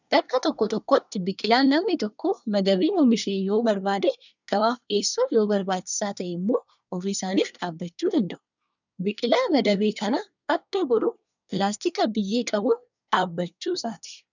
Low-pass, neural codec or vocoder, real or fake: 7.2 kHz; codec, 24 kHz, 1 kbps, SNAC; fake